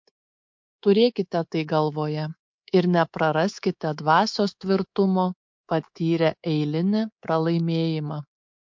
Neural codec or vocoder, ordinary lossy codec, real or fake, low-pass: codec, 24 kHz, 3.1 kbps, DualCodec; MP3, 48 kbps; fake; 7.2 kHz